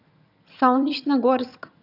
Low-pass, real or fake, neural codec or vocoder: 5.4 kHz; fake; vocoder, 22.05 kHz, 80 mel bands, HiFi-GAN